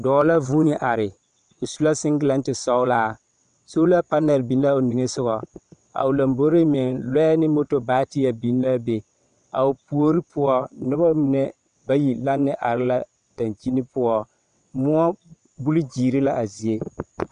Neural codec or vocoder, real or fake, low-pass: vocoder, 22.05 kHz, 80 mel bands, WaveNeXt; fake; 9.9 kHz